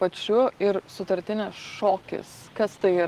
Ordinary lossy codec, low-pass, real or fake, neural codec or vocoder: Opus, 16 kbps; 14.4 kHz; real; none